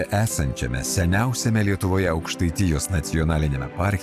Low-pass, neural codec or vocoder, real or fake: 14.4 kHz; none; real